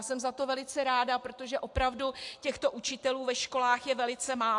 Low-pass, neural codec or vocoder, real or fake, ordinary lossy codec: 10.8 kHz; none; real; AAC, 64 kbps